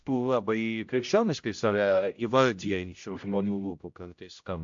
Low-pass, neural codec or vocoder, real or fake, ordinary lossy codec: 7.2 kHz; codec, 16 kHz, 0.5 kbps, X-Codec, HuBERT features, trained on general audio; fake; AAC, 64 kbps